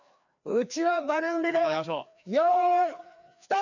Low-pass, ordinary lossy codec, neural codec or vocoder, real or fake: 7.2 kHz; none; codec, 16 kHz, 2 kbps, FreqCodec, larger model; fake